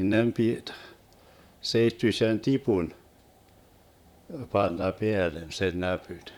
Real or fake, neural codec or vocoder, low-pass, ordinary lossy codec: fake; vocoder, 44.1 kHz, 128 mel bands, Pupu-Vocoder; 19.8 kHz; none